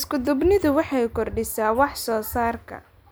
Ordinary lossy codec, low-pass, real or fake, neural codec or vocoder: none; none; real; none